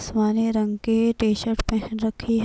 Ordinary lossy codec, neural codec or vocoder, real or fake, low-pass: none; none; real; none